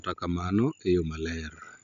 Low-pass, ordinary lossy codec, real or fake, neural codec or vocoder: 7.2 kHz; none; real; none